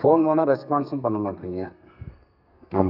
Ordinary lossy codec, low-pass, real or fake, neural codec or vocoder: none; 5.4 kHz; fake; codec, 32 kHz, 1.9 kbps, SNAC